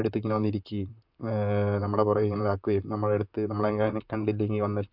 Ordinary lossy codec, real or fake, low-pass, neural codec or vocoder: AAC, 48 kbps; fake; 5.4 kHz; vocoder, 44.1 kHz, 128 mel bands, Pupu-Vocoder